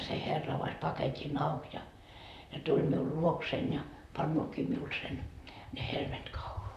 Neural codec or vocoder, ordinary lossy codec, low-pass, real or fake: vocoder, 44.1 kHz, 128 mel bands, Pupu-Vocoder; none; 14.4 kHz; fake